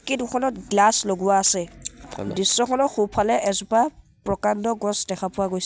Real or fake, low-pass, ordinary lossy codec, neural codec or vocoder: real; none; none; none